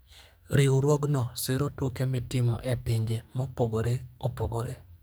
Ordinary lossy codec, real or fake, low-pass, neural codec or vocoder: none; fake; none; codec, 44.1 kHz, 2.6 kbps, SNAC